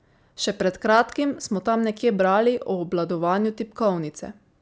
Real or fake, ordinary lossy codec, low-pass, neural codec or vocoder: real; none; none; none